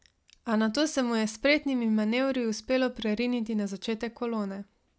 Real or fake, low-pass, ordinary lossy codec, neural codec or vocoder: real; none; none; none